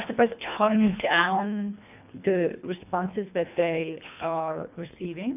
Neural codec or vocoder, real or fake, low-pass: codec, 24 kHz, 1.5 kbps, HILCodec; fake; 3.6 kHz